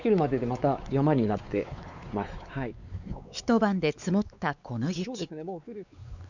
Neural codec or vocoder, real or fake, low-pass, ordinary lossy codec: codec, 16 kHz, 4 kbps, X-Codec, WavLM features, trained on Multilingual LibriSpeech; fake; 7.2 kHz; none